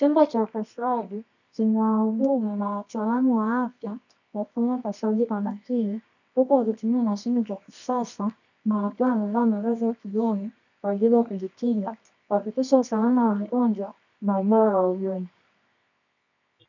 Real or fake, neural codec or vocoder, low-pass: fake; codec, 24 kHz, 0.9 kbps, WavTokenizer, medium music audio release; 7.2 kHz